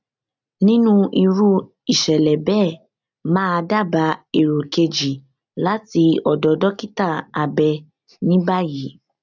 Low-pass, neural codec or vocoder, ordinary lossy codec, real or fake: 7.2 kHz; none; none; real